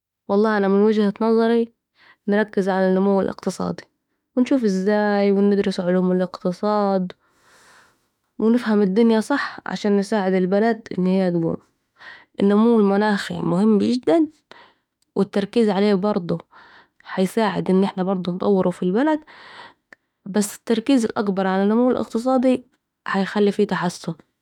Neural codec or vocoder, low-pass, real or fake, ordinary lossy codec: autoencoder, 48 kHz, 32 numbers a frame, DAC-VAE, trained on Japanese speech; 19.8 kHz; fake; none